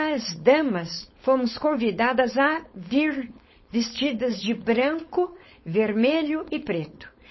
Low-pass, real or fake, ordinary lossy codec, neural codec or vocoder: 7.2 kHz; fake; MP3, 24 kbps; codec, 16 kHz, 4.8 kbps, FACodec